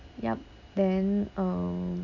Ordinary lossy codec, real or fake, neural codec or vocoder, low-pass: none; real; none; 7.2 kHz